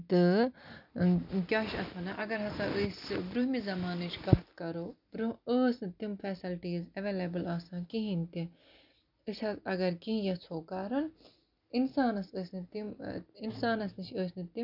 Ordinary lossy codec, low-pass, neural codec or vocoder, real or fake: none; 5.4 kHz; none; real